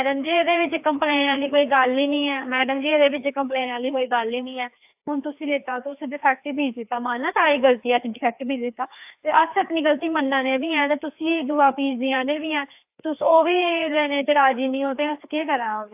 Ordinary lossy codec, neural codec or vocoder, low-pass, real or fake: AAC, 32 kbps; codec, 16 kHz, 2 kbps, FreqCodec, larger model; 3.6 kHz; fake